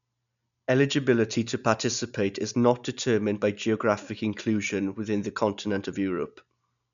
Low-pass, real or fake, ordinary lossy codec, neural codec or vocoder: 7.2 kHz; real; none; none